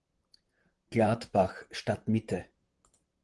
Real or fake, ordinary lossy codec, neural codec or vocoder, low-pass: fake; Opus, 24 kbps; vocoder, 44.1 kHz, 128 mel bands, Pupu-Vocoder; 10.8 kHz